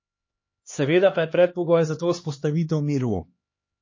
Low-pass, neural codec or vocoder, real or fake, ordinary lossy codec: 7.2 kHz; codec, 16 kHz, 2 kbps, X-Codec, HuBERT features, trained on LibriSpeech; fake; MP3, 32 kbps